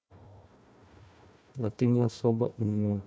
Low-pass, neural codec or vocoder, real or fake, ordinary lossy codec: none; codec, 16 kHz, 1 kbps, FunCodec, trained on Chinese and English, 50 frames a second; fake; none